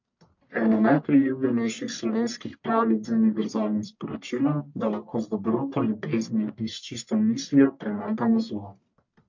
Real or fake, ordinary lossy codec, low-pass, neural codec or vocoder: fake; MP3, 48 kbps; 7.2 kHz; codec, 44.1 kHz, 1.7 kbps, Pupu-Codec